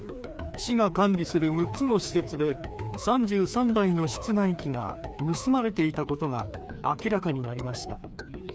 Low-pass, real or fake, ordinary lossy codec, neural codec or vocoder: none; fake; none; codec, 16 kHz, 2 kbps, FreqCodec, larger model